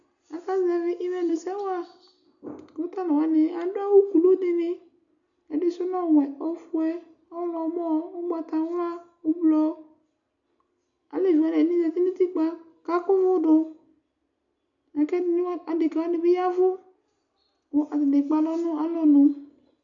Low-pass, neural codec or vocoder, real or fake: 7.2 kHz; none; real